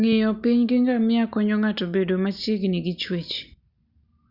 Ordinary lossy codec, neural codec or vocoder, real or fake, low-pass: Opus, 64 kbps; none; real; 5.4 kHz